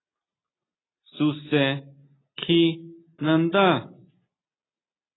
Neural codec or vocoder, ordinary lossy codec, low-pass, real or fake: none; AAC, 16 kbps; 7.2 kHz; real